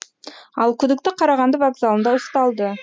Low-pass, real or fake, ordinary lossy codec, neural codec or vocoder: none; real; none; none